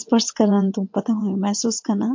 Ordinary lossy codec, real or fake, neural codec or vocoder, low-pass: MP3, 48 kbps; fake; vocoder, 22.05 kHz, 80 mel bands, WaveNeXt; 7.2 kHz